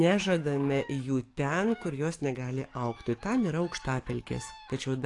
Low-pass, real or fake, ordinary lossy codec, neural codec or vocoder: 10.8 kHz; fake; AAC, 48 kbps; codec, 44.1 kHz, 7.8 kbps, DAC